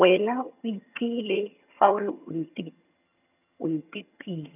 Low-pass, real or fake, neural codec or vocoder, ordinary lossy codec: 3.6 kHz; fake; vocoder, 22.05 kHz, 80 mel bands, HiFi-GAN; none